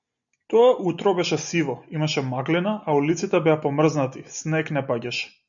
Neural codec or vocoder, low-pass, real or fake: none; 7.2 kHz; real